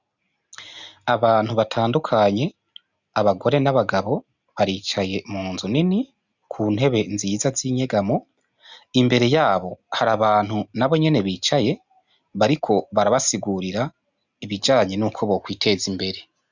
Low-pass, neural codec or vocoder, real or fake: 7.2 kHz; none; real